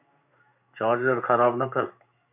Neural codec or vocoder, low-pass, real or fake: codec, 16 kHz in and 24 kHz out, 1 kbps, XY-Tokenizer; 3.6 kHz; fake